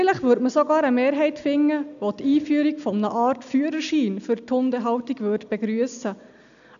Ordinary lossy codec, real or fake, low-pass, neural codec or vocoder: none; real; 7.2 kHz; none